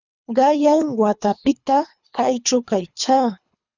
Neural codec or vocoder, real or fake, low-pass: codec, 24 kHz, 3 kbps, HILCodec; fake; 7.2 kHz